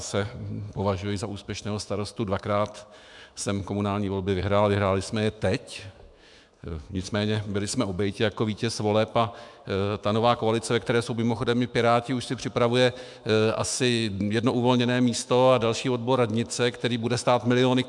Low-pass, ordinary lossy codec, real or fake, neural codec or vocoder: 10.8 kHz; MP3, 96 kbps; fake; autoencoder, 48 kHz, 128 numbers a frame, DAC-VAE, trained on Japanese speech